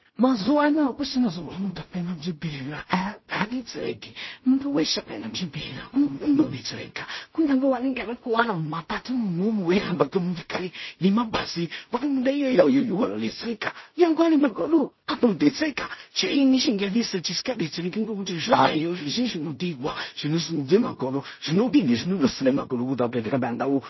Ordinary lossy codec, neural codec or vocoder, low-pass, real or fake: MP3, 24 kbps; codec, 16 kHz in and 24 kHz out, 0.4 kbps, LongCat-Audio-Codec, two codebook decoder; 7.2 kHz; fake